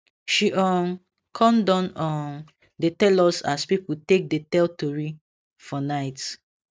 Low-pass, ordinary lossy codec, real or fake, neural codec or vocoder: none; none; real; none